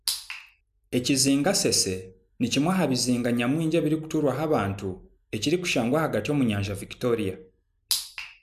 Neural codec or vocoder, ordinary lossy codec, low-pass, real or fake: none; none; 14.4 kHz; real